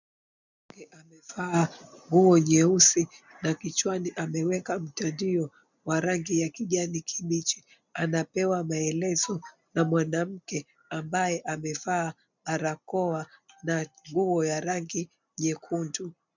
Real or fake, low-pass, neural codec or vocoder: real; 7.2 kHz; none